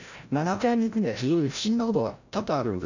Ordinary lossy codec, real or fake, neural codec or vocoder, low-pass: none; fake; codec, 16 kHz, 0.5 kbps, FreqCodec, larger model; 7.2 kHz